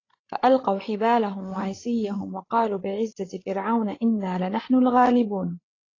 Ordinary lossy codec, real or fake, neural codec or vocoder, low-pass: AAC, 32 kbps; fake; codec, 16 kHz, 8 kbps, FreqCodec, larger model; 7.2 kHz